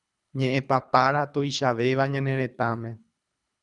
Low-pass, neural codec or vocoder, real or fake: 10.8 kHz; codec, 24 kHz, 3 kbps, HILCodec; fake